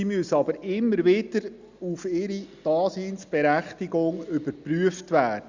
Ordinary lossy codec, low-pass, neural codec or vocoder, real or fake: Opus, 64 kbps; 7.2 kHz; none; real